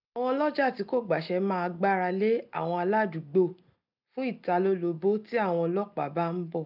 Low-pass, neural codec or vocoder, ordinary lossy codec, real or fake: 5.4 kHz; none; none; real